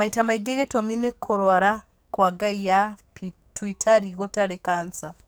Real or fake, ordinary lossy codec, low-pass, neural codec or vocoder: fake; none; none; codec, 44.1 kHz, 2.6 kbps, SNAC